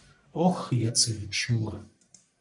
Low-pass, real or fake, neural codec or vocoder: 10.8 kHz; fake; codec, 44.1 kHz, 1.7 kbps, Pupu-Codec